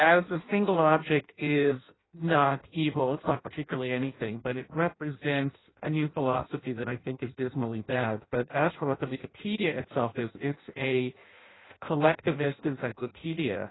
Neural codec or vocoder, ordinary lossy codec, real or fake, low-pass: codec, 16 kHz in and 24 kHz out, 0.6 kbps, FireRedTTS-2 codec; AAC, 16 kbps; fake; 7.2 kHz